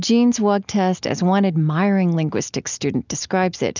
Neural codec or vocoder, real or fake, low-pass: none; real; 7.2 kHz